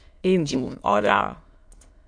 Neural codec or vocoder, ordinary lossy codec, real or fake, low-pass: autoencoder, 22.05 kHz, a latent of 192 numbers a frame, VITS, trained on many speakers; AAC, 64 kbps; fake; 9.9 kHz